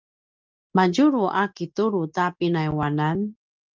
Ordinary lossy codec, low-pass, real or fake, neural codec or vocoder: Opus, 24 kbps; 7.2 kHz; real; none